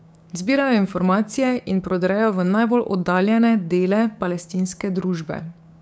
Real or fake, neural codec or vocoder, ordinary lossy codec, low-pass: fake; codec, 16 kHz, 6 kbps, DAC; none; none